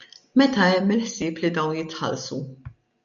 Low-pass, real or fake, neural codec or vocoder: 7.2 kHz; real; none